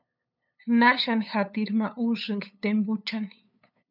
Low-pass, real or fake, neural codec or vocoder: 5.4 kHz; fake; codec, 16 kHz, 8 kbps, FunCodec, trained on LibriTTS, 25 frames a second